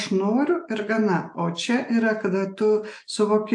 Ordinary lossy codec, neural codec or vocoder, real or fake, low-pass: AAC, 64 kbps; none; real; 10.8 kHz